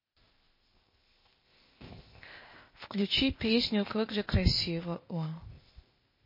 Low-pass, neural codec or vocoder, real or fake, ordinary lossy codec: 5.4 kHz; codec, 16 kHz, 0.8 kbps, ZipCodec; fake; MP3, 24 kbps